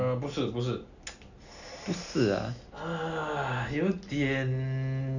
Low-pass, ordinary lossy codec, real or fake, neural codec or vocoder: 7.2 kHz; none; real; none